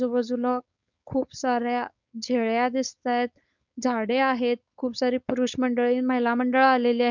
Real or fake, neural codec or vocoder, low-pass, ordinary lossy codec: fake; codec, 16 kHz, 4.8 kbps, FACodec; 7.2 kHz; none